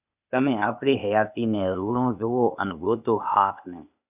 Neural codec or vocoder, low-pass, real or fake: codec, 16 kHz, 0.8 kbps, ZipCodec; 3.6 kHz; fake